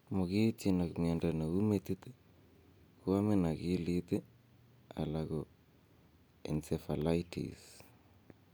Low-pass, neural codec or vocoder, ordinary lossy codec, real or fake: none; none; none; real